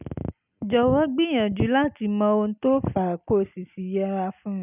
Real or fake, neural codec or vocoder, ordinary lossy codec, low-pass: real; none; none; 3.6 kHz